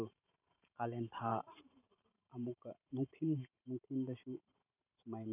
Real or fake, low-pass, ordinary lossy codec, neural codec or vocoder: fake; 3.6 kHz; none; vocoder, 44.1 kHz, 128 mel bands every 512 samples, BigVGAN v2